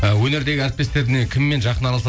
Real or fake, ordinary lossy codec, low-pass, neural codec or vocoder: real; none; none; none